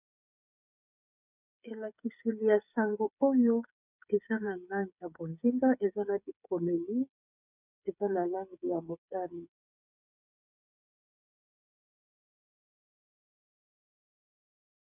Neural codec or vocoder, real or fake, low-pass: codec, 16 kHz, 8 kbps, FreqCodec, smaller model; fake; 3.6 kHz